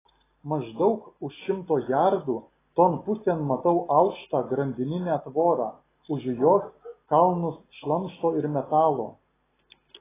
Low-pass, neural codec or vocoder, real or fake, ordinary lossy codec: 3.6 kHz; none; real; AAC, 16 kbps